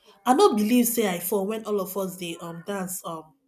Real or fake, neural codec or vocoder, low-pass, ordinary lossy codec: real; none; 14.4 kHz; none